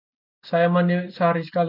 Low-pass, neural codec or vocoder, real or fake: 5.4 kHz; none; real